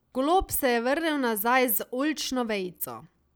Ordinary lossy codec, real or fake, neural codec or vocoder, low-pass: none; real; none; none